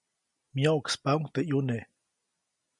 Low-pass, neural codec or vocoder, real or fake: 10.8 kHz; none; real